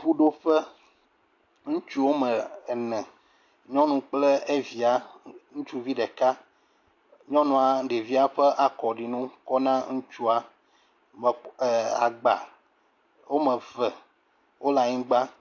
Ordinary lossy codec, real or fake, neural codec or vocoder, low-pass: AAC, 48 kbps; real; none; 7.2 kHz